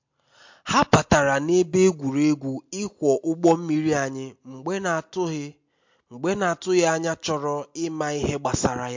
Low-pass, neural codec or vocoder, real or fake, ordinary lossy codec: 7.2 kHz; none; real; MP3, 48 kbps